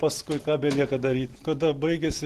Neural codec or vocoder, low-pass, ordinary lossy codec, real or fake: none; 14.4 kHz; Opus, 16 kbps; real